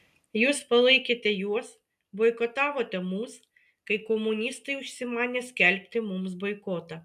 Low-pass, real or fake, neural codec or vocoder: 14.4 kHz; real; none